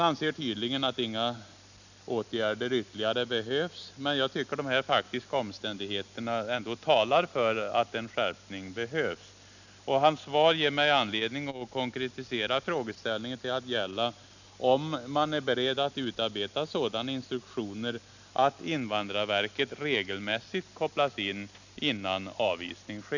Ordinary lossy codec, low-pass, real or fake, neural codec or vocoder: none; 7.2 kHz; real; none